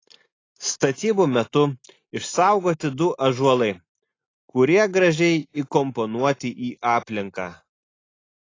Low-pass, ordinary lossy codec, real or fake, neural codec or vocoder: 7.2 kHz; AAC, 32 kbps; real; none